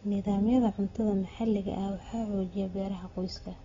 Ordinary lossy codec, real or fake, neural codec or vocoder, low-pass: AAC, 24 kbps; real; none; 7.2 kHz